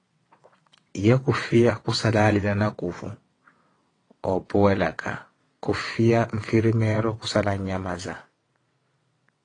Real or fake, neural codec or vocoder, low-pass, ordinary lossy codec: fake; vocoder, 22.05 kHz, 80 mel bands, WaveNeXt; 9.9 kHz; AAC, 32 kbps